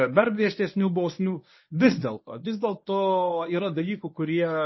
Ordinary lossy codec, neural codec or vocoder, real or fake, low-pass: MP3, 24 kbps; codec, 24 kHz, 0.9 kbps, WavTokenizer, medium speech release version 1; fake; 7.2 kHz